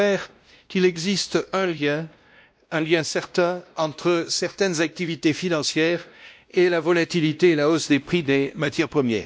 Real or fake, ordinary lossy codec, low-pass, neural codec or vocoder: fake; none; none; codec, 16 kHz, 1 kbps, X-Codec, WavLM features, trained on Multilingual LibriSpeech